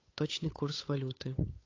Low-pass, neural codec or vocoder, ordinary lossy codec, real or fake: 7.2 kHz; codec, 16 kHz, 8 kbps, FunCodec, trained on Chinese and English, 25 frames a second; AAC, 32 kbps; fake